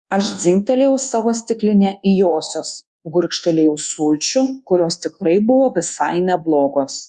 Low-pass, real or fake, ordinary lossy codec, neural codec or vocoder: 10.8 kHz; fake; Opus, 64 kbps; codec, 24 kHz, 1.2 kbps, DualCodec